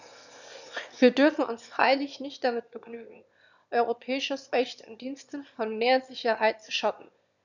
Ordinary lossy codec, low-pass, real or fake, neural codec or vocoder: none; 7.2 kHz; fake; autoencoder, 22.05 kHz, a latent of 192 numbers a frame, VITS, trained on one speaker